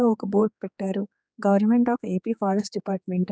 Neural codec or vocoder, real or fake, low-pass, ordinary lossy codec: codec, 16 kHz, 4 kbps, X-Codec, HuBERT features, trained on general audio; fake; none; none